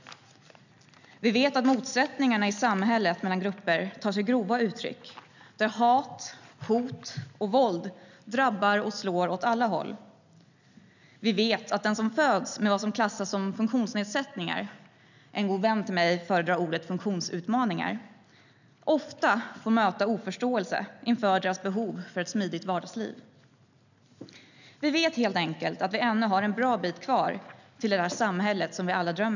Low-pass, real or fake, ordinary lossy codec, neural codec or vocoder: 7.2 kHz; real; none; none